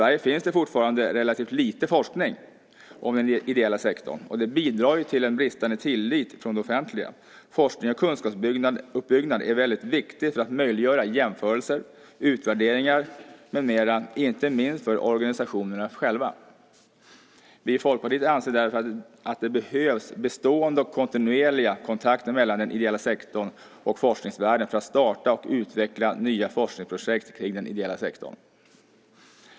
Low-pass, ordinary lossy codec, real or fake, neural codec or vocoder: none; none; real; none